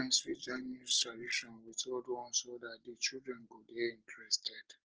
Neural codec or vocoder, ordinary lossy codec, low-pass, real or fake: none; Opus, 16 kbps; 7.2 kHz; real